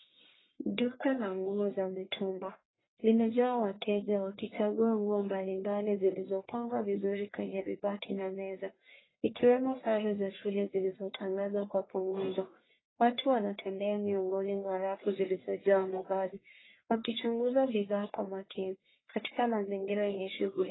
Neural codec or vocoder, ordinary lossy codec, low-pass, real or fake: codec, 44.1 kHz, 1.7 kbps, Pupu-Codec; AAC, 16 kbps; 7.2 kHz; fake